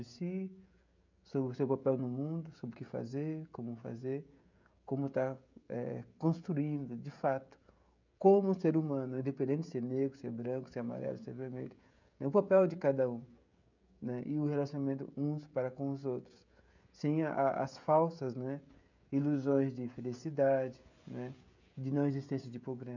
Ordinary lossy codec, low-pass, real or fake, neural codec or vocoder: none; 7.2 kHz; fake; codec, 16 kHz, 16 kbps, FreqCodec, smaller model